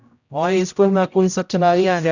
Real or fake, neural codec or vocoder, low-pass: fake; codec, 16 kHz, 0.5 kbps, X-Codec, HuBERT features, trained on general audio; 7.2 kHz